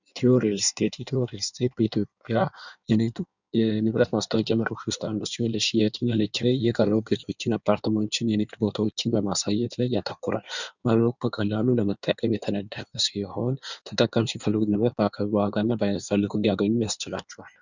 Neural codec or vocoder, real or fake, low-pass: codec, 16 kHz in and 24 kHz out, 1.1 kbps, FireRedTTS-2 codec; fake; 7.2 kHz